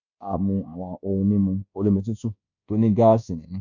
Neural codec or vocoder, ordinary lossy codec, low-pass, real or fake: codec, 24 kHz, 1.2 kbps, DualCodec; none; 7.2 kHz; fake